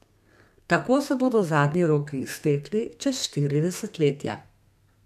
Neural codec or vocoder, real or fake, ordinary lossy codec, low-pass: codec, 32 kHz, 1.9 kbps, SNAC; fake; none; 14.4 kHz